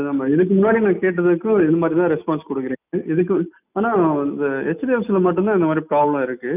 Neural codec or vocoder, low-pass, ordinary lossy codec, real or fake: none; 3.6 kHz; none; real